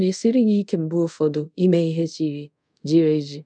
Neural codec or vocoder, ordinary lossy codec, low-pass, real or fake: codec, 24 kHz, 0.5 kbps, DualCodec; none; 9.9 kHz; fake